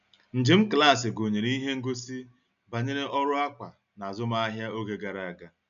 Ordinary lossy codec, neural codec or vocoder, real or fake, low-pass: none; none; real; 7.2 kHz